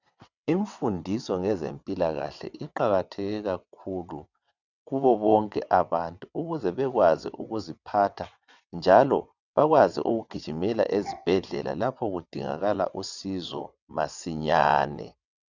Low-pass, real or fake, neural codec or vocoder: 7.2 kHz; fake; vocoder, 22.05 kHz, 80 mel bands, WaveNeXt